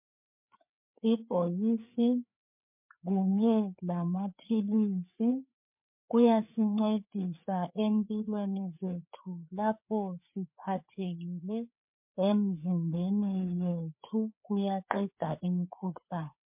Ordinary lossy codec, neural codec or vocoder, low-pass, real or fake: MP3, 32 kbps; codec, 16 kHz, 4 kbps, FreqCodec, larger model; 3.6 kHz; fake